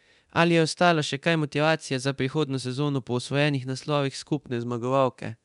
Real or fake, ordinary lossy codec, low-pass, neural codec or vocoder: fake; none; 10.8 kHz; codec, 24 kHz, 0.9 kbps, DualCodec